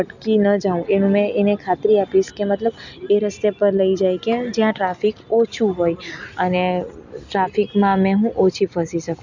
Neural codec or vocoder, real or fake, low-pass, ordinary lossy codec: none; real; 7.2 kHz; none